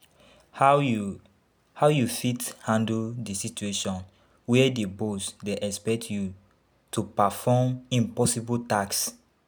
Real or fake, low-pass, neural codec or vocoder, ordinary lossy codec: real; none; none; none